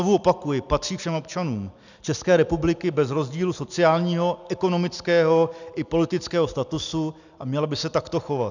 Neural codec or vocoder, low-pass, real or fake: none; 7.2 kHz; real